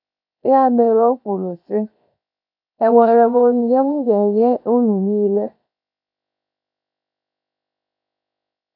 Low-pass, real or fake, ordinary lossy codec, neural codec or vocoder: 5.4 kHz; fake; none; codec, 16 kHz, 0.7 kbps, FocalCodec